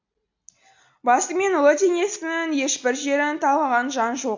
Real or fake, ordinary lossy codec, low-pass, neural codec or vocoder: real; AAC, 48 kbps; 7.2 kHz; none